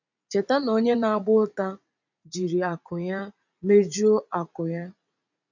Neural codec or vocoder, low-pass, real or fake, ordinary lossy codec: vocoder, 44.1 kHz, 80 mel bands, Vocos; 7.2 kHz; fake; none